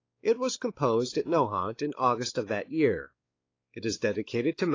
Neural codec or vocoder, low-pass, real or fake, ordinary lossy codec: codec, 16 kHz, 4 kbps, X-Codec, WavLM features, trained on Multilingual LibriSpeech; 7.2 kHz; fake; AAC, 32 kbps